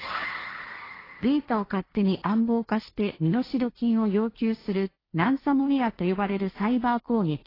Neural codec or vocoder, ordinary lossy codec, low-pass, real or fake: codec, 16 kHz, 1.1 kbps, Voila-Tokenizer; AAC, 24 kbps; 5.4 kHz; fake